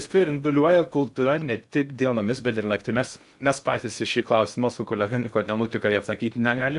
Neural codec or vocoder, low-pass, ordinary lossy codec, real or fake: codec, 16 kHz in and 24 kHz out, 0.6 kbps, FocalCodec, streaming, 4096 codes; 10.8 kHz; AAC, 96 kbps; fake